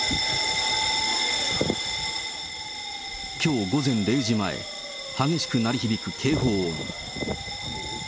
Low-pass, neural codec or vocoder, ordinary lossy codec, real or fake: none; none; none; real